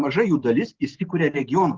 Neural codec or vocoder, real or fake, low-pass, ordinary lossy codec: none; real; 7.2 kHz; Opus, 16 kbps